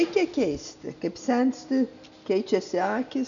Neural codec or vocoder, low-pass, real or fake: none; 7.2 kHz; real